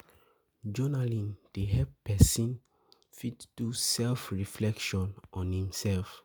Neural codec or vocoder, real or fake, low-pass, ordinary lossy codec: none; real; none; none